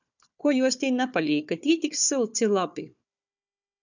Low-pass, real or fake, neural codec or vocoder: 7.2 kHz; fake; codec, 16 kHz, 4 kbps, FunCodec, trained on Chinese and English, 50 frames a second